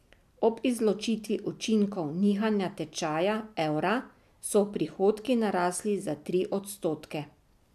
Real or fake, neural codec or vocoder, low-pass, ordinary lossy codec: real; none; 14.4 kHz; AAC, 96 kbps